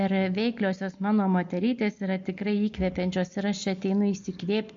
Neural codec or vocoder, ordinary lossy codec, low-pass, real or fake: none; MP3, 48 kbps; 7.2 kHz; real